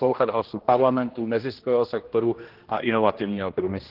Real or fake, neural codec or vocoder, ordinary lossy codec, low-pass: fake; codec, 16 kHz, 1 kbps, X-Codec, HuBERT features, trained on general audio; Opus, 16 kbps; 5.4 kHz